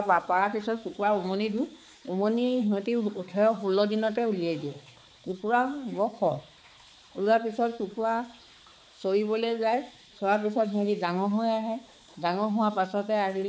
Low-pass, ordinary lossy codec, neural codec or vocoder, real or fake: none; none; codec, 16 kHz, 4 kbps, X-Codec, HuBERT features, trained on balanced general audio; fake